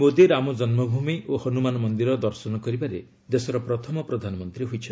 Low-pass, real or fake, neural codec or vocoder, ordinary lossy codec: none; real; none; none